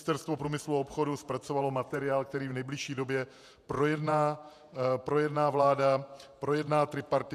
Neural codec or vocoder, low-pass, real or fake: vocoder, 48 kHz, 128 mel bands, Vocos; 14.4 kHz; fake